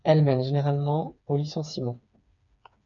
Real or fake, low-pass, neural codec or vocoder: fake; 7.2 kHz; codec, 16 kHz, 4 kbps, FreqCodec, smaller model